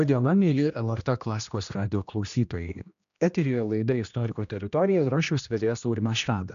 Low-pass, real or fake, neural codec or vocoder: 7.2 kHz; fake; codec, 16 kHz, 1 kbps, X-Codec, HuBERT features, trained on general audio